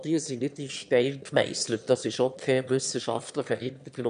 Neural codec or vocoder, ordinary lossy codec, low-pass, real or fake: autoencoder, 22.05 kHz, a latent of 192 numbers a frame, VITS, trained on one speaker; AAC, 96 kbps; 9.9 kHz; fake